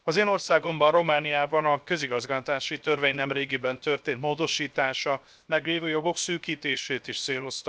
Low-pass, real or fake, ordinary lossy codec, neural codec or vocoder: none; fake; none; codec, 16 kHz, 0.7 kbps, FocalCodec